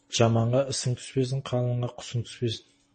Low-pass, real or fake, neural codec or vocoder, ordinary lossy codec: 10.8 kHz; real; none; MP3, 32 kbps